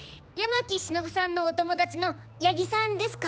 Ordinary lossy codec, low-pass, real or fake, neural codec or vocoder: none; none; fake; codec, 16 kHz, 2 kbps, X-Codec, HuBERT features, trained on general audio